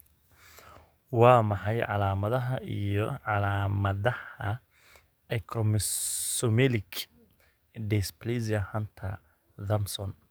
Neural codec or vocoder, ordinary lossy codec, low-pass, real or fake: codec, 44.1 kHz, 7.8 kbps, DAC; none; none; fake